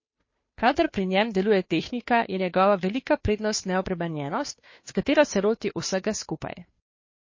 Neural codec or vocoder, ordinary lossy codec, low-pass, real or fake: codec, 16 kHz, 2 kbps, FunCodec, trained on Chinese and English, 25 frames a second; MP3, 32 kbps; 7.2 kHz; fake